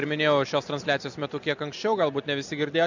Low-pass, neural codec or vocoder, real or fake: 7.2 kHz; none; real